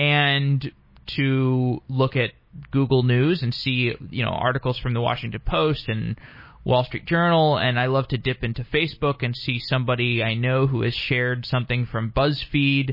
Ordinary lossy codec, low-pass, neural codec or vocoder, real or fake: MP3, 24 kbps; 5.4 kHz; none; real